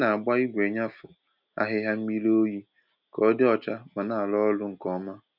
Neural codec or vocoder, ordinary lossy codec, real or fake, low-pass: none; none; real; 5.4 kHz